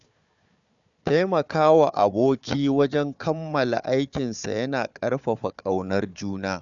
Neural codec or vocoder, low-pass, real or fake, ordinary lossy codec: codec, 16 kHz, 4 kbps, FunCodec, trained on Chinese and English, 50 frames a second; 7.2 kHz; fake; none